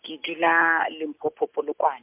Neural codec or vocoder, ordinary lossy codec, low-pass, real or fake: none; MP3, 32 kbps; 3.6 kHz; real